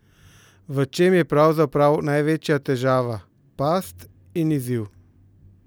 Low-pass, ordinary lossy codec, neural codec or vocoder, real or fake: none; none; none; real